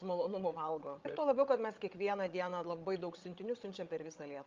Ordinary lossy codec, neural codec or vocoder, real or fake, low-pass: Opus, 32 kbps; codec, 16 kHz, 16 kbps, FreqCodec, larger model; fake; 7.2 kHz